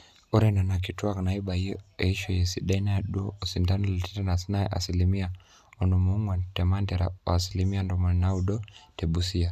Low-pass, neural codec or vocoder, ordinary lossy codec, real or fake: 14.4 kHz; none; none; real